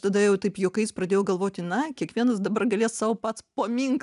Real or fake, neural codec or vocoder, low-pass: real; none; 10.8 kHz